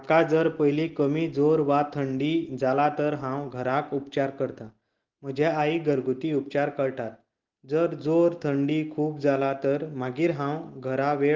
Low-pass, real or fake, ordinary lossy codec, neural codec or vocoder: 7.2 kHz; real; Opus, 16 kbps; none